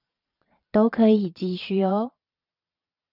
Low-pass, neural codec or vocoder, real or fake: 5.4 kHz; vocoder, 22.05 kHz, 80 mel bands, Vocos; fake